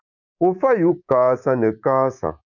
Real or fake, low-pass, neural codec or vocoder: fake; 7.2 kHz; codec, 24 kHz, 3.1 kbps, DualCodec